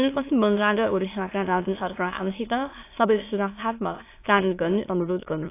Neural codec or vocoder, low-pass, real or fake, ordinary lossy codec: autoencoder, 22.05 kHz, a latent of 192 numbers a frame, VITS, trained on many speakers; 3.6 kHz; fake; AAC, 24 kbps